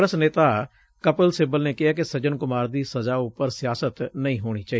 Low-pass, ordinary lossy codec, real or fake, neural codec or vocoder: none; none; real; none